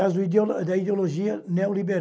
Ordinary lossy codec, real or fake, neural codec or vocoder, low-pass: none; real; none; none